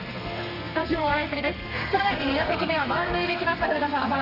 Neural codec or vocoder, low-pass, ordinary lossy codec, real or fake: codec, 32 kHz, 1.9 kbps, SNAC; 5.4 kHz; none; fake